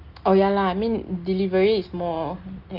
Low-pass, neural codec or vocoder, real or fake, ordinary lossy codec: 5.4 kHz; none; real; Opus, 32 kbps